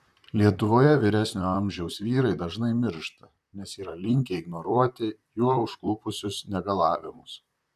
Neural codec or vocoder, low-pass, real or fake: vocoder, 44.1 kHz, 128 mel bands, Pupu-Vocoder; 14.4 kHz; fake